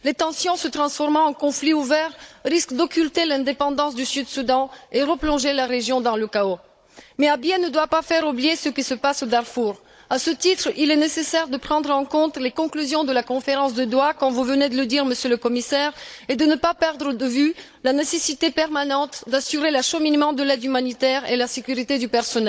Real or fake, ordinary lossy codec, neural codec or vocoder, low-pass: fake; none; codec, 16 kHz, 16 kbps, FunCodec, trained on Chinese and English, 50 frames a second; none